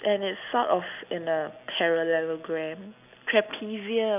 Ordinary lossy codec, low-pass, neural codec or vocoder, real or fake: none; 3.6 kHz; none; real